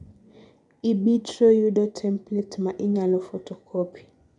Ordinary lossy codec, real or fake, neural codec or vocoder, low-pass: none; fake; vocoder, 24 kHz, 100 mel bands, Vocos; 10.8 kHz